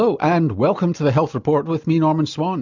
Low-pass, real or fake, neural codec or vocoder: 7.2 kHz; real; none